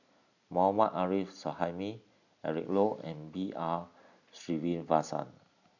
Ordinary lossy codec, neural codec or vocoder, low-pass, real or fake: none; none; 7.2 kHz; real